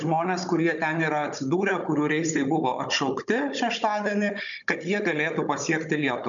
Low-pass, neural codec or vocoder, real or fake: 7.2 kHz; codec, 16 kHz, 16 kbps, FunCodec, trained on Chinese and English, 50 frames a second; fake